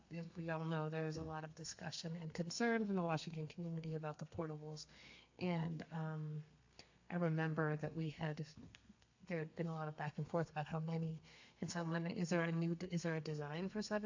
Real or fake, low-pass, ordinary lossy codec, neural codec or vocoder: fake; 7.2 kHz; AAC, 48 kbps; codec, 32 kHz, 1.9 kbps, SNAC